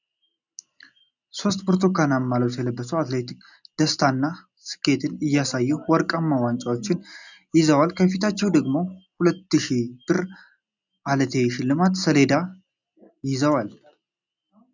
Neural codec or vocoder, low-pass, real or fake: none; 7.2 kHz; real